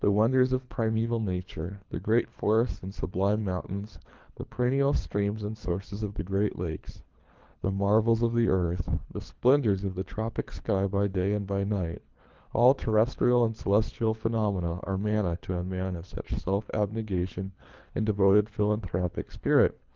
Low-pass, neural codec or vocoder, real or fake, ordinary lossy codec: 7.2 kHz; codec, 24 kHz, 3 kbps, HILCodec; fake; Opus, 32 kbps